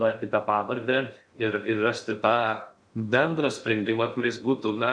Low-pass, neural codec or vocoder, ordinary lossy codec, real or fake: 9.9 kHz; codec, 16 kHz in and 24 kHz out, 0.6 kbps, FocalCodec, streaming, 2048 codes; Opus, 64 kbps; fake